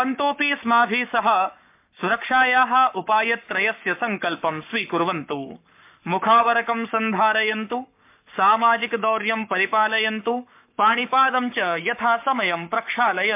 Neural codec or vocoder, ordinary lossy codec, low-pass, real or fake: codec, 44.1 kHz, 7.8 kbps, Pupu-Codec; MP3, 32 kbps; 3.6 kHz; fake